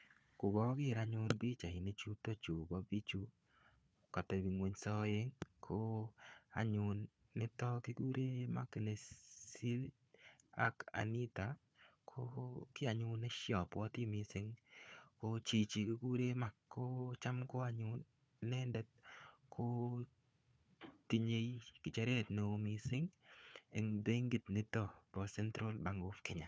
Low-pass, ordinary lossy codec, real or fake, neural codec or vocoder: none; none; fake; codec, 16 kHz, 4 kbps, FunCodec, trained on Chinese and English, 50 frames a second